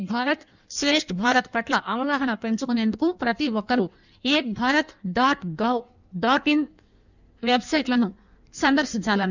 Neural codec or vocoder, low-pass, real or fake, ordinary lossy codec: codec, 16 kHz in and 24 kHz out, 1.1 kbps, FireRedTTS-2 codec; 7.2 kHz; fake; none